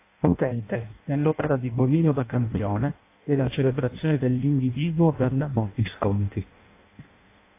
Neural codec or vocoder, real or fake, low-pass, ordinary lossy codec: codec, 16 kHz in and 24 kHz out, 0.6 kbps, FireRedTTS-2 codec; fake; 3.6 kHz; AAC, 24 kbps